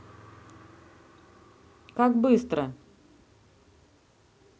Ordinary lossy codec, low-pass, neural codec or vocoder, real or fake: none; none; none; real